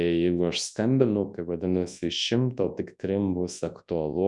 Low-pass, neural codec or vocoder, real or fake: 10.8 kHz; codec, 24 kHz, 0.9 kbps, WavTokenizer, large speech release; fake